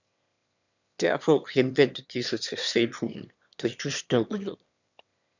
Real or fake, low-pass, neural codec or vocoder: fake; 7.2 kHz; autoencoder, 22.05 kHz, a latent of 192 numbers a frame, VITS, trained on one speaker